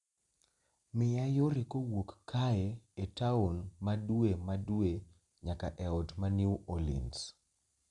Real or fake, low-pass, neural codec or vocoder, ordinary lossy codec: real; 10.8 kHz; none; Opus, 64 kbps